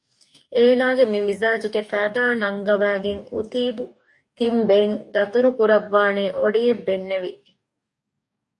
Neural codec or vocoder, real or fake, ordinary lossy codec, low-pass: codec, 44.1 kHz, 2.6 kbps, DAC; fake; MP3, 64 kbps; 10.8 kHz